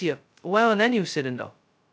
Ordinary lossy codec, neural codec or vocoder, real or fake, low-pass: none; codec, 16 kHz, 0.2 kbps, FocalCodec; fake; none